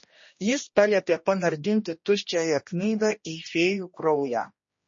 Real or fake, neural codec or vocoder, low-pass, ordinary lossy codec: fake; codec, 16 kHz, 1 kbps, X-Codec, HuBERT features, trained on general audio; 7.2 kHz; MP3, 32 kbps